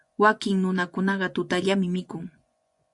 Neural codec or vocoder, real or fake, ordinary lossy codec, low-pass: vocoder, 24 kHz, 100 mel bands, Vocos; fake; MP3, 64 kbps; 10.8 kHz